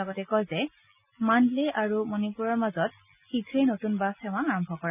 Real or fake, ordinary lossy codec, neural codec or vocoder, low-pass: real; none; none; 3.6 kHz